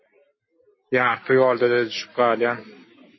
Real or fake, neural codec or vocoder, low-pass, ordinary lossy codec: real; none; 7.2 kHz; MP3, 24 kbps